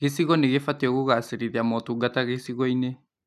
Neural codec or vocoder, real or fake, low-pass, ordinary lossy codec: none; real; 14.4 kHz; none